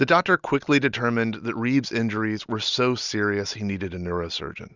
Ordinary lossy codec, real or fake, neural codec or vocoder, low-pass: Opus, 64 kbps; real; none; 7.2 kHz